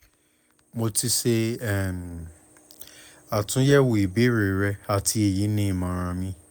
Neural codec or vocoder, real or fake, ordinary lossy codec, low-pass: none; real; none; none